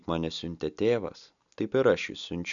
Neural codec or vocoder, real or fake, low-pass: none; real; 7.2 kHz